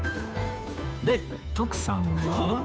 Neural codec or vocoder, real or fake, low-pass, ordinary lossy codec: codec, 16 kHz, 2 kbps, FunCodec, trained on Chinese and English, 25 frames a second; fake; none; none